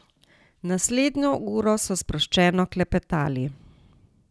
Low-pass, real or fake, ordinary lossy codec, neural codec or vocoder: none; real; none; none